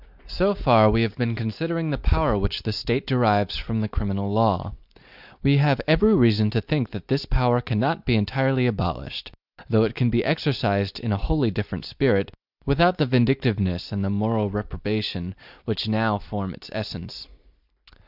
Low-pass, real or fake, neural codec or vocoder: 5.4 kHz; real; none